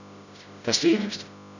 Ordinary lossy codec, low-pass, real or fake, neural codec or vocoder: none; 7.2 kHz; fake; codec, 16 kHz, 0.5 kbps, FreqCodec, smaller model